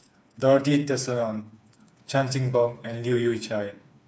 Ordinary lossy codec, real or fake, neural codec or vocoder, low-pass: none; fake; codec, 16 kHz, 4 kbps, FreqCodec, smaller model; none